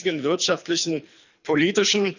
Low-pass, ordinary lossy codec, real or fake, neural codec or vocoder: 7.2 kHz; none; fake; codec, 24 kHz, 3 kbps, HILCodec